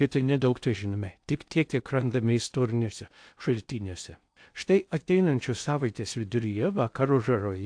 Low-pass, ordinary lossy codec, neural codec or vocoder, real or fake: 9.9 kHz; AAC, 64 kbps; codec, 16 kHz in and 24 kHz out, 0.6 kbps, FocalCodec, streaming, 2048 codes; fake